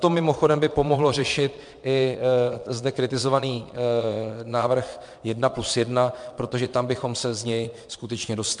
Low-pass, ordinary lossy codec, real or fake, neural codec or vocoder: 9.9 kHz; MP3, 64 kbps; fake; vocoder, 22.05 kHz, 80 mel bands, Vocos